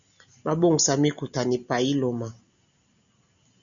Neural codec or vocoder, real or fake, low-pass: none; real; 7.2 kHz